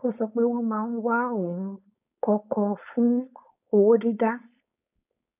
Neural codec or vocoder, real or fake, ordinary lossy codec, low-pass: codec, 16 kHz, 4.8 kbps, FACodec; fake; none; 3.6 kHz